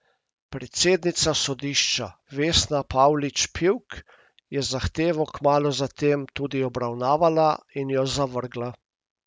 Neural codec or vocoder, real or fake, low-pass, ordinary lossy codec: none; real; none; none